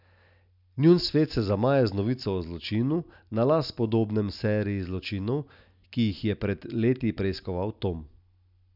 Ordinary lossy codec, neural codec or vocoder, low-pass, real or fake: none; none; 5.4 kHz; real